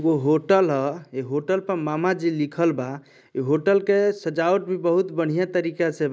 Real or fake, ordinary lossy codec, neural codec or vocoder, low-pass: real; none; none; none